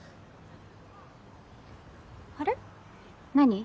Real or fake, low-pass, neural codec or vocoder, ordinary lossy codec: real; none; none; none